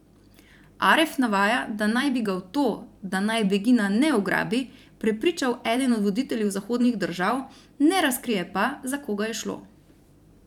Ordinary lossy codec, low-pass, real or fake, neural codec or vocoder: none; 19.8 kHz; fake; vocoder, 44.1 kHz, 128 mel bands every 512 samples, BigVGAN v2